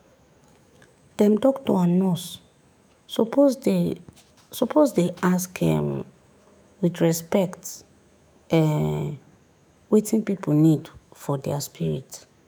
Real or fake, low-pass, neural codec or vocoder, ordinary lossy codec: fake; none; autoencoder, 48 kHz, 128 numbers a frame, DAC-VAE, trained on Japanese speech; none